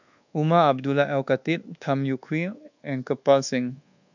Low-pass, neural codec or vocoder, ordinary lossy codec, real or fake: 7.2 kHz; codec, 24 kHz, 1.2 kbps, DualCodec; none; fake